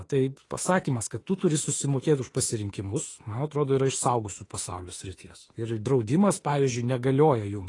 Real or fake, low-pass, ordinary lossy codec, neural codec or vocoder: fake; 10.8 kHz; AAC, 32 kbps; autoencoder, 48 kHz, 32 numbers a frame, DAC-VAE, trained on Japanese speech